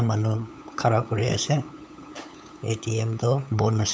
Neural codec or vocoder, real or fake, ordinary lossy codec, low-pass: codec, 16 kHz, 8 kbps, FunCodec, trained on LibriTTS, 25 frames a second; fake; none; none